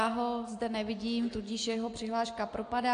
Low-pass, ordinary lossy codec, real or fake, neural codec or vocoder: 9.9 kHz; AAC, 48 kbps; real; none